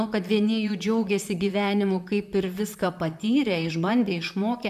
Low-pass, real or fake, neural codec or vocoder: 14.4 kHz; fake; vocoder, 44.1 kHz, 128 mel bands, Pupu-Vocoder